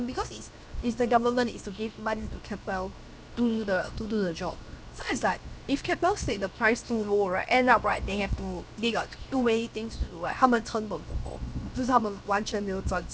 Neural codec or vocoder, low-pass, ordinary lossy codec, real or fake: codec, 16 kHz, 0.7 kbps, FocalCodec; none; none; fake